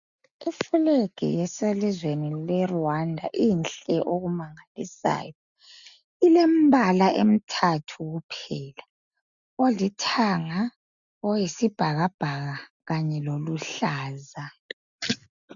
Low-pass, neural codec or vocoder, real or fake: 7.2 kHz; none; real